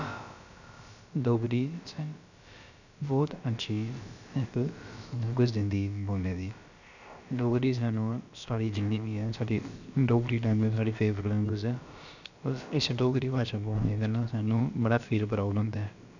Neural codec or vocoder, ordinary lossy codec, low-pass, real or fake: codec, 16 kHz, about 1 kbps, DyCAST, with the encoder's durations; none; 7.2 kHz; fake